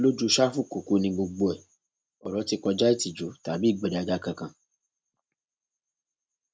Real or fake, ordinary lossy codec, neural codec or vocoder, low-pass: real; none; none; none